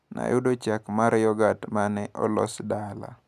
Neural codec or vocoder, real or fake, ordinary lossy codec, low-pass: none; real; none; 14.4 kHz